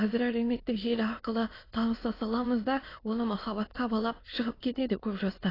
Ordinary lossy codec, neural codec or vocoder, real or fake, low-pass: AAC, 24 kbps; autoencoder, 22.05 kHz, a latent of 192 numbers a frame, VITS, trained on many speakers; fake; 5.4 kHz